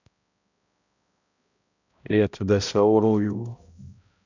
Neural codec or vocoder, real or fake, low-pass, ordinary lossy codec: codec, 16 kHz, 0.5 kbps, X-Codec, HuBERT features, trained on balanced general audio; fake; 7.2 kHz; none